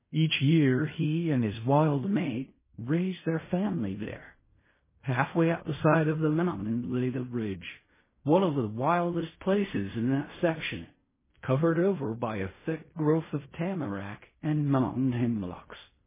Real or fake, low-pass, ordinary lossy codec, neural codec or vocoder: fake; 3.6 kHz; MP3, 16 kbps; codec, 16 kHz in and 24 kHz out, 0.4 kbps, LongCat-Audio-Codec, fine tuned four codebook decoder